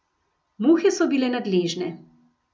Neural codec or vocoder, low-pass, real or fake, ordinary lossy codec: none; none; real; none